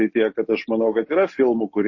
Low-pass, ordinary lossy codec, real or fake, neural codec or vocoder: 7.2 kHz; MP3, 32 kbps; real; none